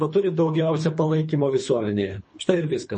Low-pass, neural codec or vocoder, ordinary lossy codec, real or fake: 10.8 kHz; codec, 24 kHz, 3 kbps, HILCodec; MP3, 32 kbps; fake